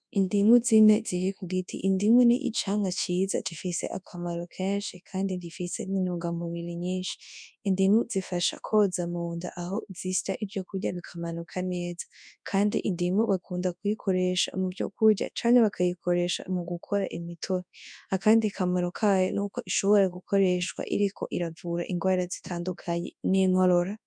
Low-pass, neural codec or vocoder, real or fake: 9.9 kHz; codec, 24 kHz, 0.9 kbps, WavTokenizer, large speech release; fake